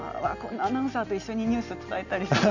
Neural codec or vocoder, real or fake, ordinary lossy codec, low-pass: none; real; none; 7.2 kHz